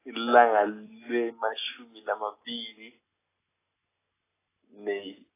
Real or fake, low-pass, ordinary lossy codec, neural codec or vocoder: real; 3.6 kHz; AAC, 16 kbps; none